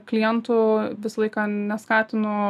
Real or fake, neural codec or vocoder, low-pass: real; none; 14.4 kHz